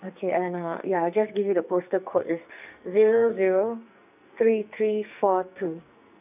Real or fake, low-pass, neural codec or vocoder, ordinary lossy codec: fake; 3.6 kHz; codec, 44.1 kHz, 2.6 kbps, SNAC; none